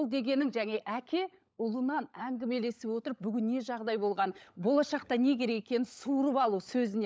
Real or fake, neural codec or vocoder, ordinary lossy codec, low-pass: fake; codec, 16 kHz, 16 kbps, FunCodec, trained on LibriTTS, 50 frames a second; none; none